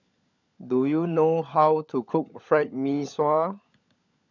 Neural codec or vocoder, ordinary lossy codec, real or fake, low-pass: codec, 16 kHz, 16 kbps, FunCodec, trained on LibriTTS, 50 frames a second; none; fake; 7.2 kHz